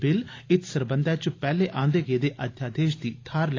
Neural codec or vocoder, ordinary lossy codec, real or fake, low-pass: none; AAC, 32 kbps; real; 7.2 kHz